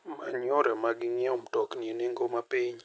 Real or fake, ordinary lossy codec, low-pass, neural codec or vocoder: real; none; none; none